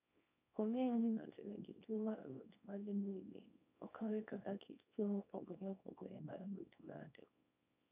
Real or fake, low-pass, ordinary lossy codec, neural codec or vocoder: fake; 3.6 kHz; none; codec, 24 kHz, 0.9 kbps, WavTokenizer, small release